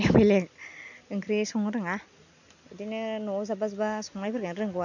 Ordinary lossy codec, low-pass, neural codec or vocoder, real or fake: none; 7.2 kHz; none; real